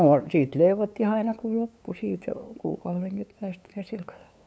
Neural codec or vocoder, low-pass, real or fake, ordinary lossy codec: codec, 16 kHz, 2 kbps, FunCodec, trained on LibriTTS, 25 frames a second; none; fake; none